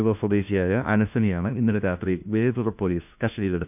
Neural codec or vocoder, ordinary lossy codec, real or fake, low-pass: codec, 16 kHz, 1 kbps, FunCodec, trained on LibriTTS, 50 frames a second; none; fake; 3.6 kHz